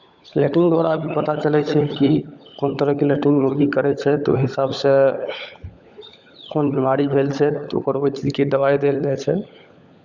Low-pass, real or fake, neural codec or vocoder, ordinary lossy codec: 7.2 kHz; fake; codec, 16 kHz, 16 kbps, FunCodec, trained on LibriTTS, 50 frames a second; Opus, 64 kbps